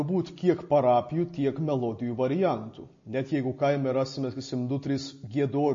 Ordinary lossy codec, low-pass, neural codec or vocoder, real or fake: MP3, 32 kbps; 7.2 kHz; none; real